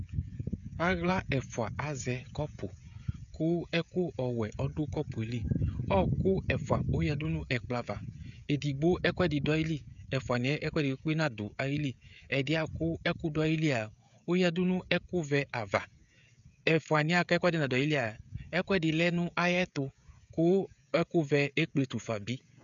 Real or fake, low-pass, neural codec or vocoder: fake; 7.2 kHz; codec, 16 kHz, 16 kbps, FreqCodec, smaller model